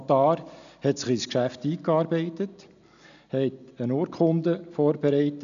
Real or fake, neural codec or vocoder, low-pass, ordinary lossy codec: real; none; 7.2 kHz; none